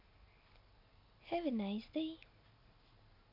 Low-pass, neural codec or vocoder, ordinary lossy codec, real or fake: 5.4 kHz; none; none; real